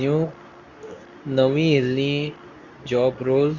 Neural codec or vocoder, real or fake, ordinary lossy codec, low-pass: codec, 24 kHz, 0.9 kbps, WavTokenizer, medium speech release version 2; fake; none; 7.2 kHz